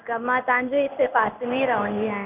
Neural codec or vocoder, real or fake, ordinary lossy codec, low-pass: codec, 16 kHz in and 24 kHz out, 1 kbps, XY-Tokenizer; fake; none; 3.6 kHz